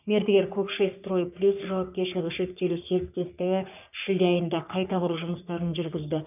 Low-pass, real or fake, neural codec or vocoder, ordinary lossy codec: 3.6 kHz; fake; codec, 44.1 kHz, 3.4 kbps, Pupu-Codec; none